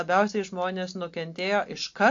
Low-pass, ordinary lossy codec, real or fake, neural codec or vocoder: 7.2 kHz; AAC, 48 kbps; real; none